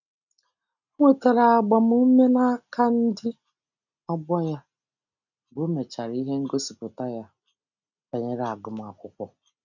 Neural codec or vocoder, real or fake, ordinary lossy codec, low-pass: none; real; none; 7.2 kHz